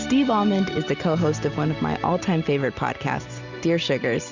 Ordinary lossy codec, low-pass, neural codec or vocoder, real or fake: Opus, 64 kbps; 7.2 kHz; none; real